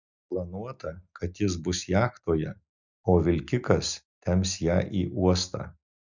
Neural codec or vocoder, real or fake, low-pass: none; real; 7.2 kHz